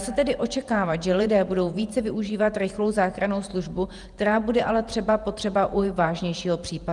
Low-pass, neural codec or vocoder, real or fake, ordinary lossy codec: 10.8 kHz; vocoder, 48 kHz, 128 mel bands, Vocos; fake; Opus, 32 kbps